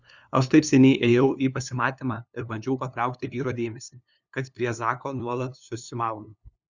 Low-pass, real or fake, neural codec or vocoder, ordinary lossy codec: 7.2 kHz; fake; codec, 16 kHz, 2 kbps, FunCodec, trained on LibriTTS, 25 frames a second; Opus, 64 kbps